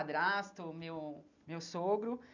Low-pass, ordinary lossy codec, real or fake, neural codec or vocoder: 7.2 kHz; none; real; none